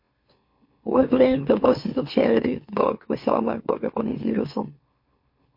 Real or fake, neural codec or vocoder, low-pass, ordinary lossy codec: fake; autoencoder, 44.1 kHz, a latent of 192 numbers a frame, MeloTTS; 5.4 kHz; MP3, 32 kbps